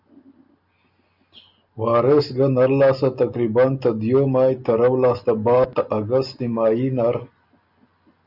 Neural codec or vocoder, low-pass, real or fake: none; 5.4 kHz; real